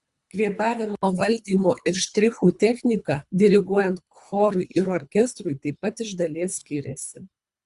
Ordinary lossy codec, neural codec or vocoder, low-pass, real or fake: Opus, 64 kbps; codec, 24 kHz, 3 kbps, HILCodec; 10.8 kHz; fake